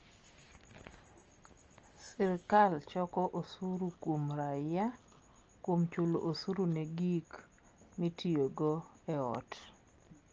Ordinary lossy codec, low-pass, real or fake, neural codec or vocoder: Opus, 24 kbps; 7.2 kHz; real; none